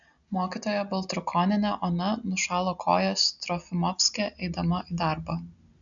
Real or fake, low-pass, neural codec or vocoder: real; 7.2 kHz; none